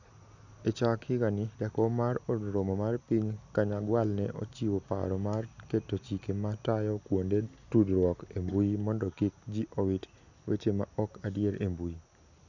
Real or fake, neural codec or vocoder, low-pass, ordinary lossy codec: real; none; 7.2 kHz; none